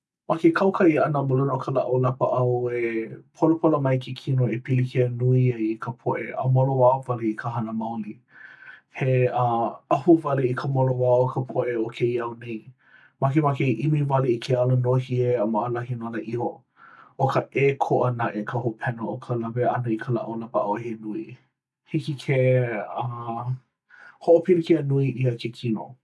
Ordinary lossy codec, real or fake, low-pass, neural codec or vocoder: none; real; none; none